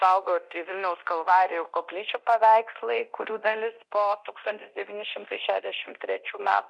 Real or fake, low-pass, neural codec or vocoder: fake; 10.8 kHz; codec, 24 kHz, 0.9 kbps, DualCodec